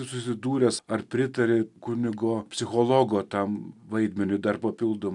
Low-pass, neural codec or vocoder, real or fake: 10.8 kHz; none; real